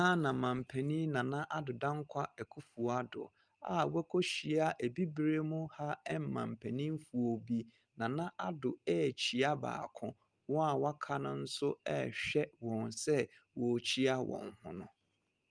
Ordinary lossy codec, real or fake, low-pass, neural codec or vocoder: Opus, 32 kbps; real; 9.9 kHz; none